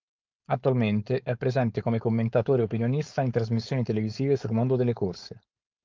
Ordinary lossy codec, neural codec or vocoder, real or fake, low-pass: Opus, 24 kbps; codec, 16 kHz, 4.8 kbps, FACodec; fake; 7.2 kHz